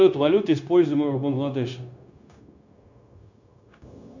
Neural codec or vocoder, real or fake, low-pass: codec, 16 kHz, 0.9 kbps, LongCat-Audio-Codec; fake; 7.2 kHz